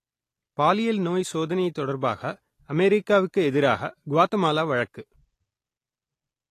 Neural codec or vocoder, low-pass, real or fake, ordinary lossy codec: none; 14.4 kHz; real; AAC, 48 kbps